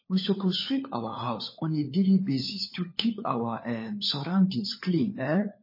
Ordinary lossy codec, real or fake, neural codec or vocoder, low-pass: MP3, 24 kbps; fake; codec, 16 kHz, 4 kbps, FunCodec, trained on LibriTTS, 50 frames a second; 5.4 kHz